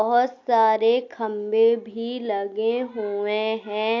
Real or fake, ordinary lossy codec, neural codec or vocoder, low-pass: real; AAC, 48 kbps; none; 7.2 kHz